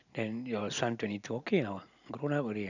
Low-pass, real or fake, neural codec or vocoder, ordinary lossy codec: 7.2 kHz; real; none; none